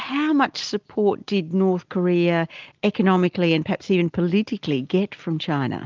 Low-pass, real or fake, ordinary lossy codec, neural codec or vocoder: 7.2 kHz; real; Opus, 32 kbps; none